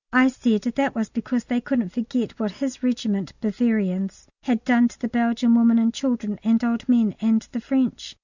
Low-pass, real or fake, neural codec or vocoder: 7.2 kHz; real; none